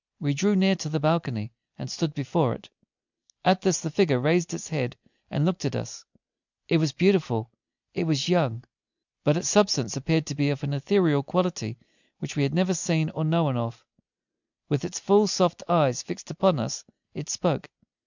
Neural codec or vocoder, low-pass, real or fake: none; 7.2 kHz; real